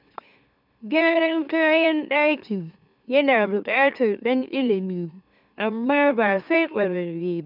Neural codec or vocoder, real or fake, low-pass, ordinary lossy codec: autoencoder, 44.1 kHz, a latent of 192 numbers a frame, MeloTTS; fake; 5.4 kHz; none